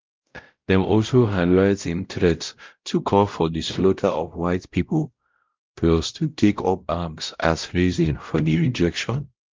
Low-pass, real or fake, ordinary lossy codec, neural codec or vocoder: 7.2 kHz; fake; Opus, 32 kbps; codec, 16 kHz, 0.5 kbps, X-Codec, WavLM features, trained on Multilingual LibriSpeech